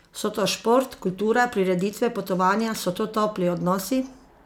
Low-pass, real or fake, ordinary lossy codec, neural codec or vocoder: 19.8 kHz; real; none; none